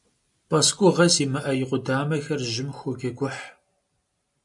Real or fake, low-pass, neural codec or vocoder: real; 10.8 kHz; none